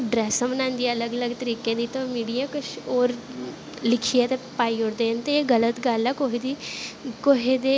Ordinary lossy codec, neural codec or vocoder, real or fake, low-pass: none; none; real; none